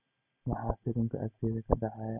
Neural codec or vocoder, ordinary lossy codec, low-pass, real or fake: none; none; 3.6 kHz; real